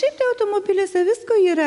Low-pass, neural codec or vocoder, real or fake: 10.8 kHz; none; real